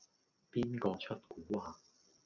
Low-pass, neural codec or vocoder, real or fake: 7.2 kHz; none; real